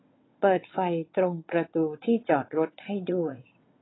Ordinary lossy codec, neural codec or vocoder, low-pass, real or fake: AAC, 16 kbps; vocoder, 22.05 kHz, 80 mel bands, WaveNeXt; 7.2 kHz; fake